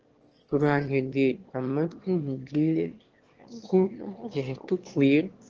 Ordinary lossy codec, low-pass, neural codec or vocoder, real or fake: Opus, 16 kbps; 7.2 kHz; autoencoder, 22.05 kHz, a latent of 192 numbers a frame, VITS, trained on one speaker; fake